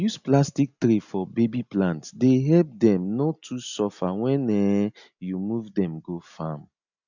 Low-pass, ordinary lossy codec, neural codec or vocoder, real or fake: 7.2 kHz; none; none; real